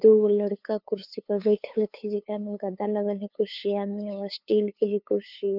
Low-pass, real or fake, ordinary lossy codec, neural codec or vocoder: 5.4 kHz; fake; none; codec, 16 kHz, 2 kbps, FunCodec, trained on LibriTTS, 25 frames a second